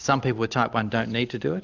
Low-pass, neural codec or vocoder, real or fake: 7.2 kHz; none; real